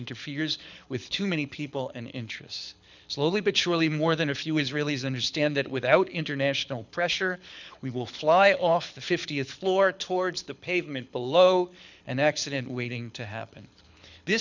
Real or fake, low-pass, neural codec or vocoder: fake; 7.2 kHz; codec, 24 kHz, 6 kbps, HILCodec